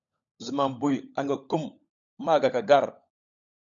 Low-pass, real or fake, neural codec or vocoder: 7.2 kHz; fake; codec, 16 kHz, 16 kbps, FunCodec, trained on LibriTTS, 50 frames a second